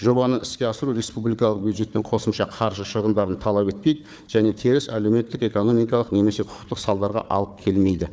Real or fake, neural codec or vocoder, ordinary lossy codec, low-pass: fake; codec, 16 kHz, 4 kbps, FunCodec, trained on Chinese and English, 50 frames a second; none; none